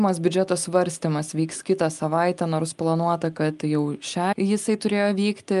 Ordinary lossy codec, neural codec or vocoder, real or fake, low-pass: Opus, 32 kbps; none; real; 10.8 kHz